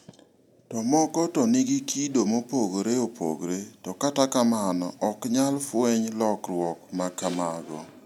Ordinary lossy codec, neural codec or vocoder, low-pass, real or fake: none; none; 19.8 kHz; real